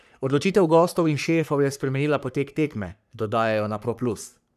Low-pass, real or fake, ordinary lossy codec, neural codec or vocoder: 14.4 kHz; fake; none; codec, 44.1 kHz, 3.4 kbps, Pupu-Codec